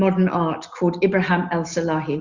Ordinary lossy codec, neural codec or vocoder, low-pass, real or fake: Opus, 64 kbps; none; 7.2 kHz; real